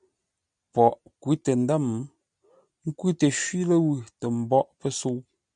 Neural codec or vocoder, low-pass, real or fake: none; 9.9 kHz; real